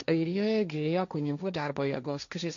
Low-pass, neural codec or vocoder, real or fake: 7.2 kHz; codec, 16 kHz, 1.1 kbps, Voila-Tokenizer; fake